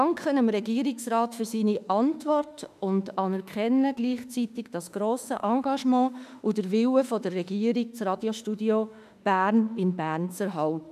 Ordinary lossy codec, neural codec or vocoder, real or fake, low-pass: none; autoencoder, 48 kHz, 32 numbers a frame, DAC-VAE, trained on Japanese speech; fake; 14.4 kHz